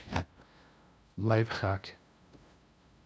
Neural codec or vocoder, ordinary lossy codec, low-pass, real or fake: codec, 16 kHz, 0.5 kbps, FunCodec, trained on LibriTTS, 25 frames a second; none; none; fake